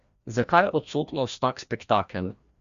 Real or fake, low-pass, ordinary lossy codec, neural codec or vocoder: fake; 7.2 kHz; Opus, 64 kbps; codec, 16 kHz, 1 kbps, FreqCodec, larger model